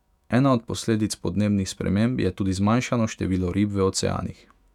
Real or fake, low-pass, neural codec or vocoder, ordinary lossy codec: fake; 19.8 kHz; autoencoder, 48 kHz, 128 numbers a frame, DAC-VAE, trained on Japanese speech; none